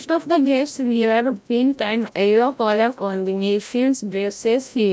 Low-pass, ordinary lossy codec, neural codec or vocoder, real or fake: none; none; codec, 16 kHz, 0.5 kbps, FreqCodec, larger model; fake